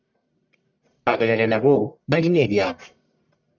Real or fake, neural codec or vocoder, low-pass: fake; codec, 44.1 kHz, 1.7 kbps, Pupu-Codec; 7.2 kHz